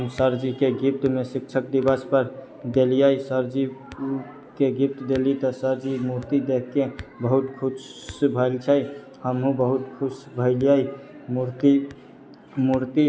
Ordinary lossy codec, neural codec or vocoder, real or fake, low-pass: none; none; real; none